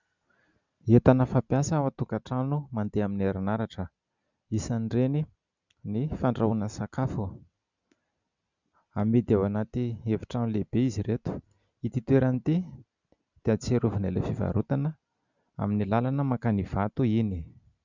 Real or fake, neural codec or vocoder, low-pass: real; none; 7.2 kHz